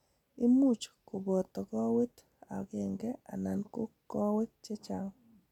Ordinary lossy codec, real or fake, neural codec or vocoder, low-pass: none; real; none; 19.8 kHz